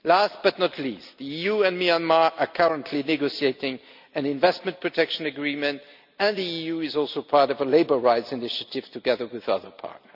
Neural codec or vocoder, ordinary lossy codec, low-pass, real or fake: none; none; 5.4 kHz; real